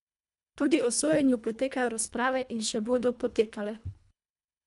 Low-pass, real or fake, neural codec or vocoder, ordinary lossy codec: 10.8 kHz; fake; codec, 24 kHz, 1.5 kbps, HILCodec; none